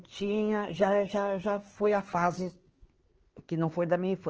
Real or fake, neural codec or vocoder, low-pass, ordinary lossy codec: fake; codec, 16 kHz, 4 kbps, X-Codec, HuBERT features, trained on LibriSpeech; 7.2 kHz; Opus, 16 kbps